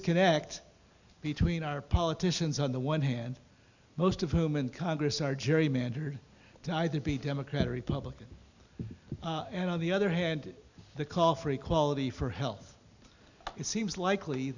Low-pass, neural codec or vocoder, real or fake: 7.2 kHz; none; real